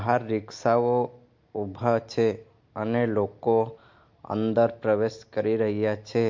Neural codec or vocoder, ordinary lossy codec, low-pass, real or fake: none; MP3, 48 kbps; 7.2 kHz; real